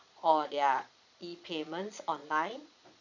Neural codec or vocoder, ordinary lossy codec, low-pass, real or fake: vocoder, 22.05 kHz, 80 mel bands, Vocos; none; 7.2 kHz; fake